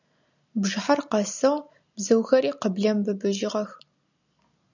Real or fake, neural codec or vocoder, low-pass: real; none; 7.2 kHz